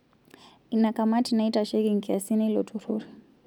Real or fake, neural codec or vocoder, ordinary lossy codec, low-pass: real; none; none; 19.8 kHz